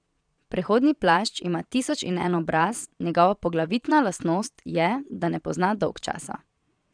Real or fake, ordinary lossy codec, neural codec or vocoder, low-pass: fake; none; vocoder, 22.05 kHz, 80 mel bands, Vocos; 9.9 kHz